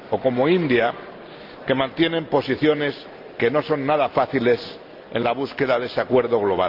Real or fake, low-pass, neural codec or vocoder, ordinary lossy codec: real; 5.4 kHz; none; Opus, 24 kbps